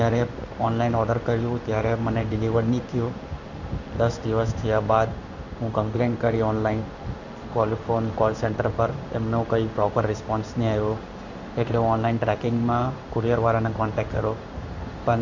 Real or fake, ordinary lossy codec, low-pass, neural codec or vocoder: fake; none; 7.2 kHz; codec, 16 kHz in and 24 kHz out, 1 kbps, XY-Tokenizer